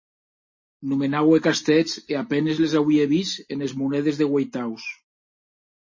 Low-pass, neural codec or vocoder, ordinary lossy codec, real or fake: 7.2 kHz; none; MP3, 32 kbps; real